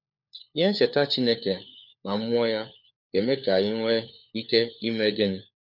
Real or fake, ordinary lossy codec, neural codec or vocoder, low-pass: fake; none; codec, 16 kHz, 4 kbps, FunCodec, trained on LibriTTS, 50 frames a second; 5.4 kHz